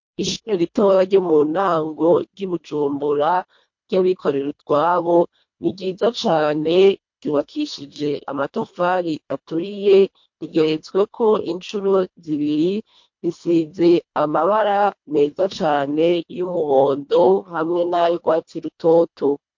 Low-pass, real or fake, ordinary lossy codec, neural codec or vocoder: 7.2 kHz; fake; MP3, 48 kbps; codec, 24 kHz, 1.5 kbps, HILCodec